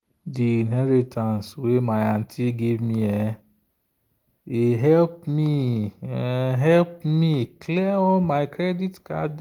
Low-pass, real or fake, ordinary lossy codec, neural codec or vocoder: 19.8 kHz; real; Opus, 24 kbps; none